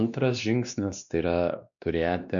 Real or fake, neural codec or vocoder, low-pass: fake; codec, 16 kHz, 2 kbps, X-Codec, WavLM features, trained on Multilingual LibriSpeech; 7.2 kHz